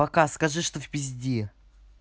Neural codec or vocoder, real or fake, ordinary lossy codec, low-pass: none; real; none; none